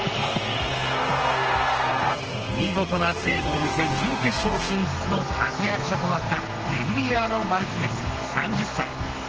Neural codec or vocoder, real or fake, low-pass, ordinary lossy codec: codec, 32 kHz, 1.9 kbps, SNAC; fake; 7.2 kHz; Opus, 16 kbps